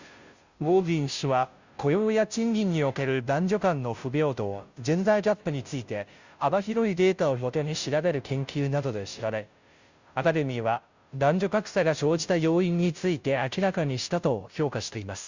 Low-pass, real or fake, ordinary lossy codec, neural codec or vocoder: 7.2 kHz; fake; Opus, 64 kbps; codec, 16 kHz, 0.5 kbps, FunCodec, trained on Chinese and English, 25 frames a second